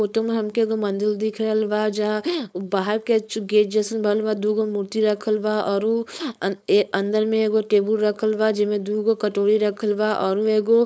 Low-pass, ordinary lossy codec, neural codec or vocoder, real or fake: none; none; codec, 16 kHz, 4.8 kbps, FACodec; fake